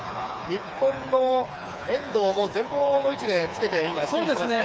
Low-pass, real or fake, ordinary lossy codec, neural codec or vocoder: none; fake; none; codec, 16 kHz, 4 kbps, FreqCodec, smaller model